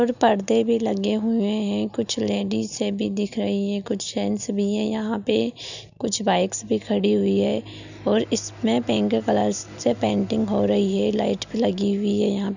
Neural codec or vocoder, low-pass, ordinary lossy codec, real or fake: none; 7.2 kHz; none; real